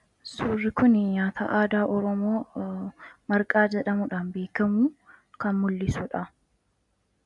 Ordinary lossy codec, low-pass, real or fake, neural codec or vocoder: MP3, 96 kbps; 10.8 kHz; real; none